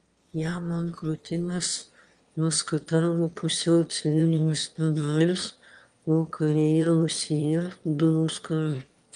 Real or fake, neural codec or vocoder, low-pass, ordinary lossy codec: fake; autoencoder, 22.05 kHz, a latent of 192 numbers a frame, VITS, trained on one speaker; 9.9 kHz; Opus, 32 kbps